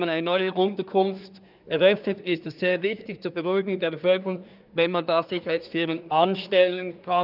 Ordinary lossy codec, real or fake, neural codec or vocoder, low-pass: none; fake; codec, 24 kHz, 1 kbps, SNAC; 5.4 kHz